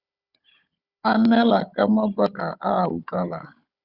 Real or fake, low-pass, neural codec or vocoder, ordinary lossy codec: fake; 5.4 kHz; codec, 16 kHz, 16 kbps, FunCodec, trained on Chinese and English, 50 frames a second; Opus, 64 kbps